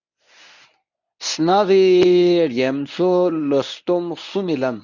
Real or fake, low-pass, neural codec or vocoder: fake; 7.2 kHz; codec, 24 kHz, 0.9 kbps, WavTokenizer, medium speech release version 1